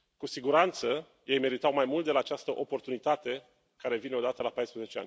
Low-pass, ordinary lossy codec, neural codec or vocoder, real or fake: none; none; none; real